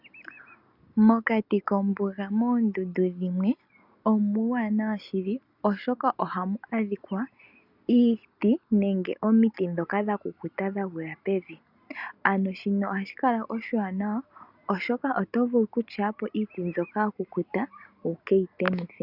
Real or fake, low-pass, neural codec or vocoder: real; 5.4 kHz; none